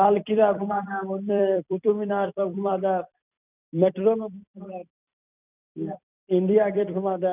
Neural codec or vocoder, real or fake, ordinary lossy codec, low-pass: vocoder, 44.1 kHz, 128 mel bands every 256 samples, BigVGAN v2; fake; none; 3.6 kHz